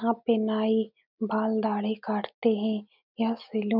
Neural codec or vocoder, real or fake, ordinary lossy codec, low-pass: none; real; none; 5.4 kHz